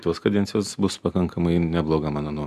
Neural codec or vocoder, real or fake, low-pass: none; real; 14.4 kHz